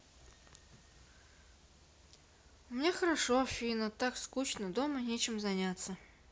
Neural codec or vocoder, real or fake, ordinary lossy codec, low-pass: none; real; none; none